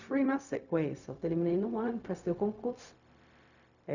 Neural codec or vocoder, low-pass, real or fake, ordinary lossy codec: codec, 16 kHz, 0.4 kbps, LongCat-Audio-Codec; 7.2 kHz; fake; none